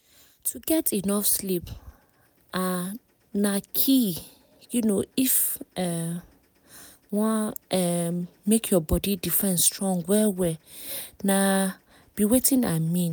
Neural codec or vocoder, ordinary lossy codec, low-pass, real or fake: none; none; none; real